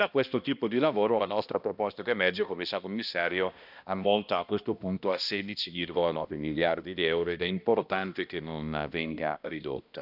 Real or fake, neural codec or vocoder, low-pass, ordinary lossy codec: fake; codec, 16 kHz, 1 kbps, X-Codec, HuBERT features, trained on balanced general audio; 5.4 kHz; none